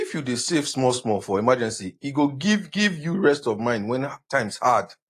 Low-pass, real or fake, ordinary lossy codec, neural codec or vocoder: 14.4 kHz; real; AAC, 48 kbps; none